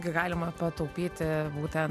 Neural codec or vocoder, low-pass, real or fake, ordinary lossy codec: none; 14.4 kHz; real; MP3, 96 kbps